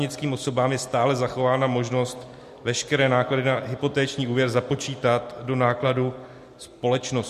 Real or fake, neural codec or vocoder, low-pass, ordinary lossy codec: real; none; 14.4 kHz; MP3, 64 kbps